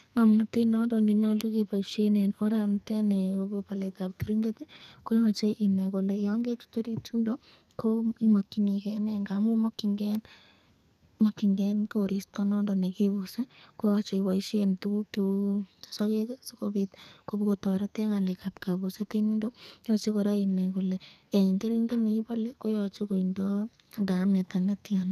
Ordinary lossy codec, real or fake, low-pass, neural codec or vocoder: none; fake; 14.4 kHz; codec, 44.1 kHz, 2.6 kbps, SNAC